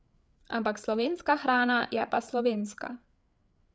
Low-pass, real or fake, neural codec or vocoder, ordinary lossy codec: none; fake; codec, 16 kHz, 8 kbps, FreqCodec, larger model; none